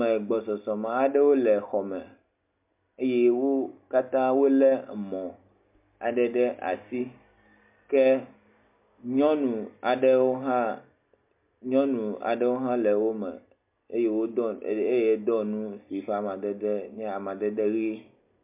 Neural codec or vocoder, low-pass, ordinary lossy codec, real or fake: none; 3.6 kHz; MP3, 32 kbps; real